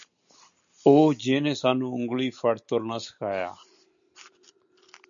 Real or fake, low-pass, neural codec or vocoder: real; 7.2 kHz; none